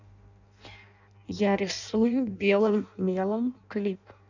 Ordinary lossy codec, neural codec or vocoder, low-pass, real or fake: none; codec, 16 kHz in and 24 kHz out, 0.6 kbps, FireRedTTS-2 codec; 7.2 kHz; fake